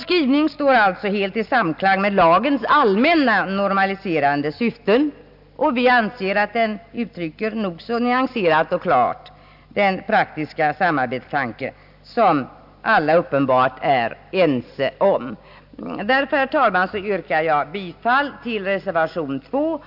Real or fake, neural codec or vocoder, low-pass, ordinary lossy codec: real; none; 5.4 kHz; AAC, 48 kbps